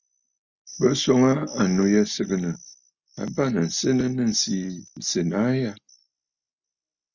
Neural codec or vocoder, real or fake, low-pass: none; real; 7.2 kHz